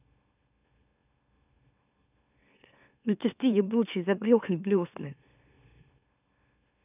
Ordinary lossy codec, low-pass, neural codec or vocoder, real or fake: none; 3.6 kHz; autoencoder, 44.1 kHz, a latent of 192 numbers a frame, MeloTTS; fake